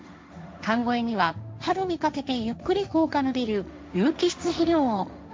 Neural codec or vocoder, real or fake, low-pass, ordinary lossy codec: codec, 16 kHz, 1.1 kbps, Voila-Tokenizer; fake; none; none